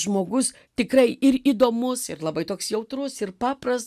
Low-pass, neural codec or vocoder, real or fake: 14.4 kHz; none; real